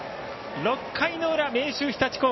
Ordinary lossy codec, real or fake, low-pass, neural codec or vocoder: MP3, 24 kbps; real; 7.2 kHz; none